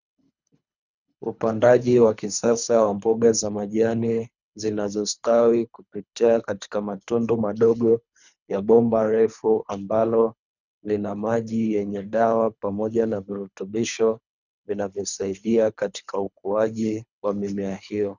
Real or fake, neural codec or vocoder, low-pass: fake; codec, 24 kHz, 3 kbps, HILCodec; 7.2 kHz